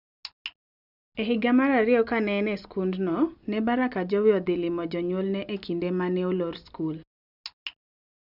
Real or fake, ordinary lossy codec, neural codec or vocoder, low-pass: real; none; none; 5.4 kHz